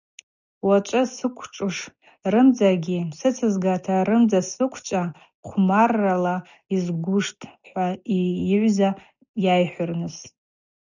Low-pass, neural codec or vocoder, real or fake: 7.2 kHz; none; real